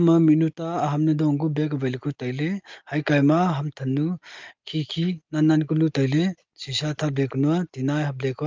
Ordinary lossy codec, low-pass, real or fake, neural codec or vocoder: Opus, 32 kbps; 7.2 kHz; real; none